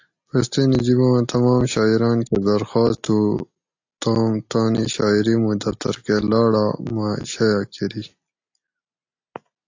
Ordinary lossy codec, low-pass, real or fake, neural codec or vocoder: AAC, 48 kbps; 7.2 kHz; real; none